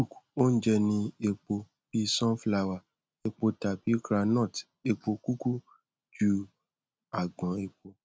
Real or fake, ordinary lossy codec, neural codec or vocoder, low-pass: real; none; none; none